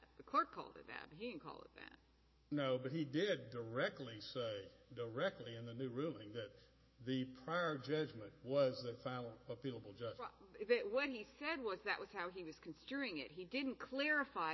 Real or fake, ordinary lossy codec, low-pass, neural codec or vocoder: fake; MP3, 24 kbps; 7.2 kHz; autoencoder, 48 kHz, 128 numbers a frame, DAC-VAE, trained on Japanese speech